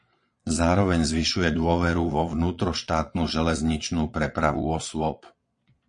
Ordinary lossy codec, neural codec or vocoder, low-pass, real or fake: MP3, 48 kbps; vocoder, 22.05 kHz, 80 mel bands, Vocos; 9.9 kHz; fake